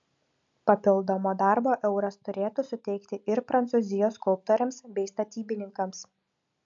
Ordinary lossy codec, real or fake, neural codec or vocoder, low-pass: AAC, 64 kbps; real; none; 7.2 kHz